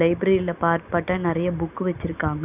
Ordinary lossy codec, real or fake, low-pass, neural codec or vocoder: none; real; 3.6 kHz; none